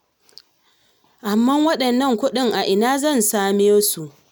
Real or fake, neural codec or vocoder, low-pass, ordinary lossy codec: real; none; none; none